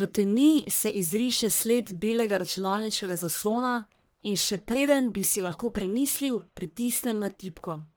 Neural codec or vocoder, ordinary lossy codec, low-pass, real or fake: codec, 44.1 kHz, 1.7 kbps, Pupu-Codec; none; none; fake